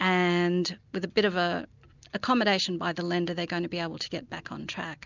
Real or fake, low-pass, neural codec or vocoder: real; 7.2 kHz; none